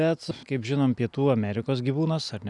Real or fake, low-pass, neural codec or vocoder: real; 10.8 kHz; none